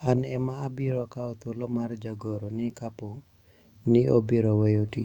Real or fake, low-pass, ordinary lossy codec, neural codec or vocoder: fake; 19.8 kHz; Opus, 64 kbps; autoencoder, 48 kHz, 128 numbers a frame, DAC-VAE, trained on Japanese speech